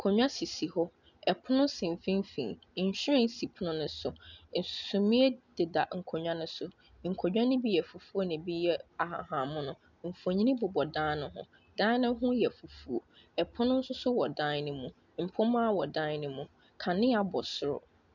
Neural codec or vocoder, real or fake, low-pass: none; real; 7.2 kHz